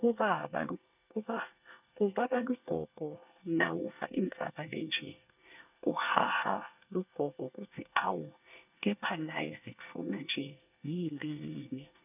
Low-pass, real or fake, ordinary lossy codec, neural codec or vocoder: 3.6 kHz; fake; none; codec, 24 kHz, 1 kbps, SNAC